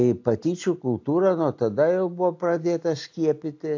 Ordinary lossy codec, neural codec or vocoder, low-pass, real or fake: AAC, 48 kbps; none; 7.2 kHz; real